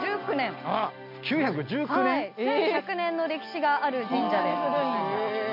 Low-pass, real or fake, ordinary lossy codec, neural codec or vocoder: 5.4 kHz; real; MP3, 48 kbps; none